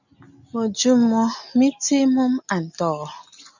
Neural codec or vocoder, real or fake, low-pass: none; real; 7.2 kHz